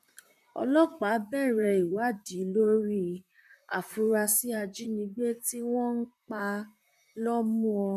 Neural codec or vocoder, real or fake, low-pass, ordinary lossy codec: vocoder, 44.1 kHz, 128 mel bands, Pupu-Vocoder; fake; 14.4 kHz; none